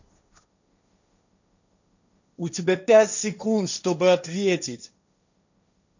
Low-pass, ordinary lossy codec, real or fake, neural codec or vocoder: 7.2 kHz; none; fake; codec, 16 kHz, 1.1 kbps, Voila-Tokenizer